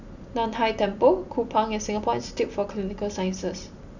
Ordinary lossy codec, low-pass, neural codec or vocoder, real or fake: none; 7.2 kHz; none; real